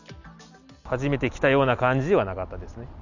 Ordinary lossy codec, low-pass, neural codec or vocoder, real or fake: none; 7.2 kHz; none; real